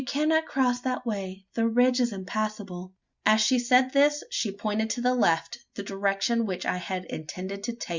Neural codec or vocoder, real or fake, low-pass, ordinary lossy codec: none; real; 7.2 kHz; Opus, 64 kbps